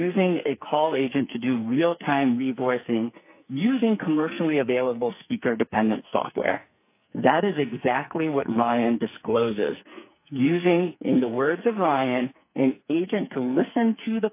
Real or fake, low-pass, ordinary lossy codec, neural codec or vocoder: fake; 3.6 kHz; AAC, 32 kbps; codec, 44.1 kHz, 2.6 kbps, SNAC